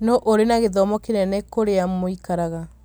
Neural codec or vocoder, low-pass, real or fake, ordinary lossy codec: none; none; real; none